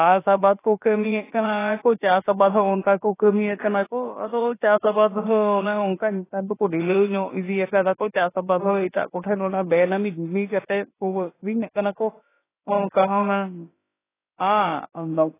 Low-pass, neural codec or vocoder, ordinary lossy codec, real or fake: 3.6 kHz; codec, 16 kHz, about 1 kbps, DyCAST, with the encoder's durations; AAC, 16 kbps; fake